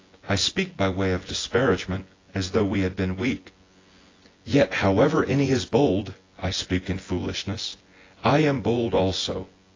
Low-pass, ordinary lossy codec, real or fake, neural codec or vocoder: 7.2 kHz; AAC, 32 kbps; fake; vocoder, 24 kHz, 100 mel bands, Vocos